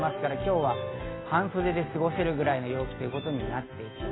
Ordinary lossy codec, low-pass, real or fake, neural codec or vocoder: AAC, 16 kbps; 7.2 kHz; real; none